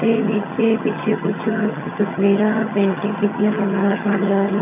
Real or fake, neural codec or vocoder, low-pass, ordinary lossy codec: fake; vocoder, 22.05 kHz, 80 mel bands, HiFi-GAN; 3.6 kHz; none